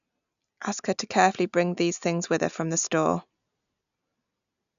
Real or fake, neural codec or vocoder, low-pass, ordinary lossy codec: real; none; 7.2 kHz; none